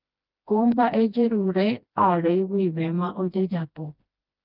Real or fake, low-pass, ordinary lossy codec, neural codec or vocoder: fake; 5.4 kHz; Opus, 24 kbps; codec, 16 kHz, 1 kbps, FreqCodec, smaller model